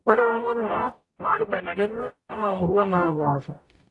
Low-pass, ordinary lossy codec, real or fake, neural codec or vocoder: 10.8 kHz; AAC, 48 kbps; fake; codec, 44.1 kHz, 0.9 kbps, DAC